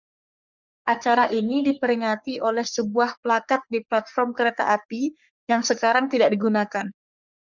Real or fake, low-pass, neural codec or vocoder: fake; 7.2 kHz; codec, 44.1 kHz, 3.4 kbps, Pupu-Codec